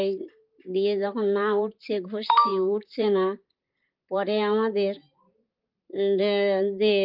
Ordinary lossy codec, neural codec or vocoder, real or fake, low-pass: Opus, 32 kbps; vocoder, 44.1 kHz, 128 mel bands, Pupu-Vocoder; fake; 5.4 kHz